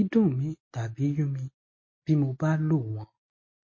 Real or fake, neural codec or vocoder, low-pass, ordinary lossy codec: real; none; 7.2 kHz; MP3, 32 kbps